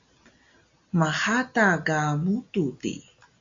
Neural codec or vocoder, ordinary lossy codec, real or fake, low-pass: none; AAC, 64 kbps; real; 7.2 kHz